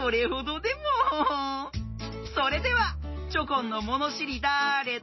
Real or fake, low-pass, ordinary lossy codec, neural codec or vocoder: real; 7.2 kHz; MP3, 24 kbps; none